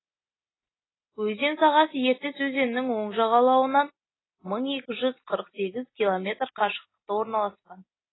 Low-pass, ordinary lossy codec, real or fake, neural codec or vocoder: 7.2 kHz; AAC, 16 kbps; real; none